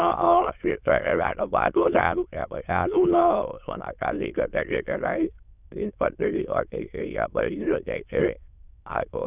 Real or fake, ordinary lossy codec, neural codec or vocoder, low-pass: fake; none; autoencoder, 22.05 kHz, a latent of 192 numbers a frame, VITS, trained on many speakers; 3.6 kHz